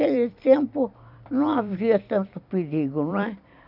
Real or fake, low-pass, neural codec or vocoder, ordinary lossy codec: fake; 5.4 kHz; vocoder, 44.1 kHz, 128 mel bands every 256 samples, BigVGAN v2; none